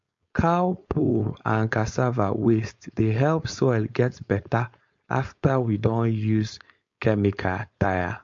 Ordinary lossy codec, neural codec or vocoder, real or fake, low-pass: MP3, 48 kbps; codec, 16 kHz, 4.8 kbps, FACodec; fake; 7.2 kHz